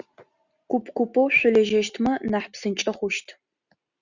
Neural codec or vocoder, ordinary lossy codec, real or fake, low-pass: none; Opus, 64 kbps; real; 7.2 kHz